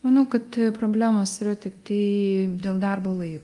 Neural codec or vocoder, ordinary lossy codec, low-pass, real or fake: codec, 24 kHz, 0.9 kbps, DualCodec; Opus, 32 kbps; 10.8 kHz; fake